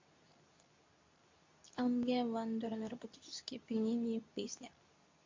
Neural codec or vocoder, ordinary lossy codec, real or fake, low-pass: codec, 24 kHz, 0.9 kbps, WavTokenizer, medium speech release version 2; MP3, 48 kbps; fake; 7.2 kHz